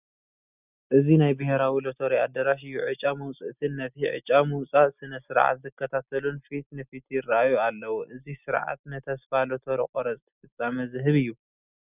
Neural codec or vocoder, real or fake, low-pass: none; real; 3.6 kHz